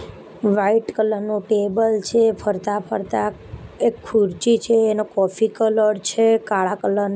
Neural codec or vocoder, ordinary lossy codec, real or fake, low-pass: none; none; real; none